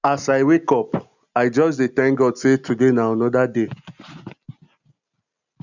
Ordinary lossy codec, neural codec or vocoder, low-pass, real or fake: none; none; 7.2 kHz; real